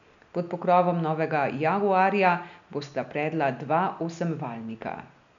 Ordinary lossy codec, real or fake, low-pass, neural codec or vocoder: none; real; 7.2 kHz; none